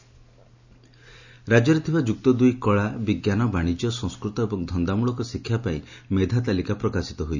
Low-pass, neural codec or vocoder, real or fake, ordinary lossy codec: 7.2 kHz; none; real; none